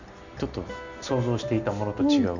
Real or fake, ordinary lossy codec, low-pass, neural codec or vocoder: real; none; 7.2 kHz; none